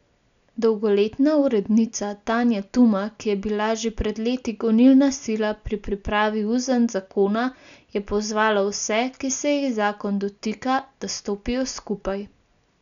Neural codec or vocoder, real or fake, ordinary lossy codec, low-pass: none; real; none; 7.2 kHz